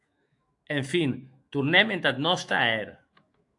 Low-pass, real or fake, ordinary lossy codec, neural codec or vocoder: 10.8 kHz; fake; AAC, 64 kbps; autoencoder, 48 kHz, 128 numbers a frame, DAC-VAE, trained on Japanese speech